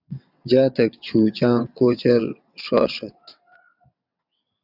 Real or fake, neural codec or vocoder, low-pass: fake; vocoder, 22.05 kHz, 80 mel bands, WaveNeXt; 5.4 kHz